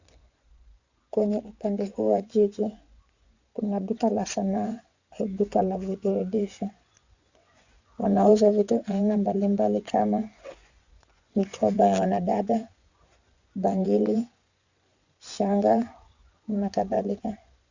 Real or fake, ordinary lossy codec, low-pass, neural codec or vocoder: fake; Opus, 64 kbps; 7.2 kHz; vocoder, 24 kHz, 100 mel bands, Vocos